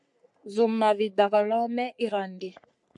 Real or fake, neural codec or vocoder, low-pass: fake; codec, 44.1 kHz, 3.4 kbps, Pupu-Codec; 10.8 kHz